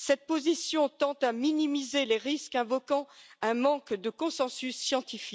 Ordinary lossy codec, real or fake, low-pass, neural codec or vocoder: none; real; none; none